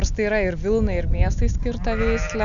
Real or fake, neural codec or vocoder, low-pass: real; none; 7.2 kHz